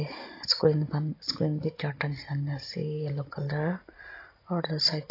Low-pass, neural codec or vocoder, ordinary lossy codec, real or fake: 5.4 kHz; none; AAC, 32 kbps; real